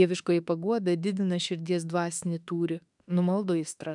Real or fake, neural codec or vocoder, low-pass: fake; autoencoder, 48 kHz, 32 numbers a frame, DAC-VAE, trained on Japanese speech; 10.8 kHz